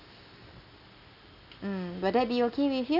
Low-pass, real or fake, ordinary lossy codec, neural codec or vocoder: 5.4 kHz; real; none; none